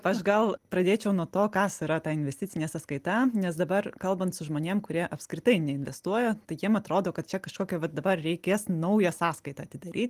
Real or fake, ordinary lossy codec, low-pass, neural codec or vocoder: real; Opus, 24 kbps; 14.4 kHz; none